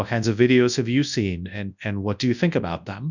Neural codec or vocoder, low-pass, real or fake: codec, 24 kHz, 0.9 kbps, WavTokenizer, large speech release; 7.2 kHz; fake